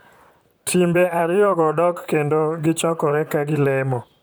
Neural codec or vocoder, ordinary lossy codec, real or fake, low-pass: vocoder, 44.1 kHz, 128 mel bands, Pupu-Vocoder; none; fake; none